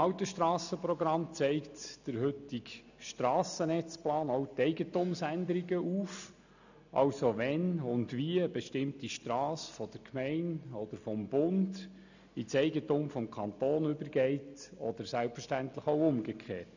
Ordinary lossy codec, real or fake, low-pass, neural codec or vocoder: none; real; 7.2 kHz; none